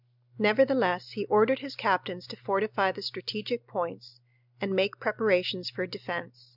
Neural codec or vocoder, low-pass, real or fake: none; 5.4 kHz; real